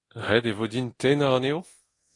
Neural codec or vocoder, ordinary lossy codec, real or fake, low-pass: codec, 24 kHz, 0.9 kbps, DualCodec; AAC, 32 kbps; fake; 10.8 kHz